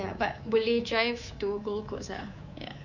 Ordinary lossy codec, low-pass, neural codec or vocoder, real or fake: none; 7.2 kHz; codec, 24 kHz, 3.1 kbps, DualCodec; fake